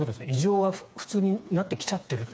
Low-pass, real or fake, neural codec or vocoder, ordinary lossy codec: none; fake; codec, 16 kHz, 4 kbps, FreqCodec, smaller model; none